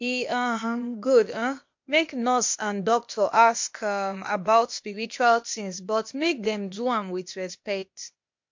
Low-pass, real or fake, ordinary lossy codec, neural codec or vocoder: 7.2 kHz; fake; MP3, 48 kbps; codec, 16 kHz, 0.8 kbps, ZipCodec